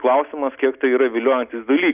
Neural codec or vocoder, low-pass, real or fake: none; 3.6 kHz; real